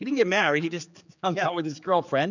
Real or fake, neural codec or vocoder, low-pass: fake; codec, 16 kHz, 4 kbps, X-Codec, HuBERT features, trained on general audio; 7.2 kHz